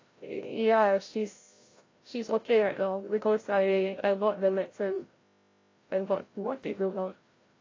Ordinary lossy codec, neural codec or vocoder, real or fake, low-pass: AAC, 32 kbps; codec, 16 kHz, 0.5 kbps, FreqCodec, larger model; fake; 7.2 kHz